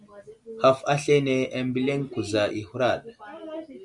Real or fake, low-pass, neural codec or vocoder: real; 10.8 kHz; none